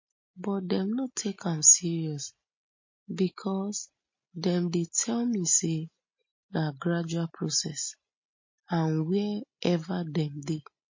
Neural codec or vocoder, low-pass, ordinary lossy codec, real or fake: none; 7.2 kHz; MP3, 32 kbps; real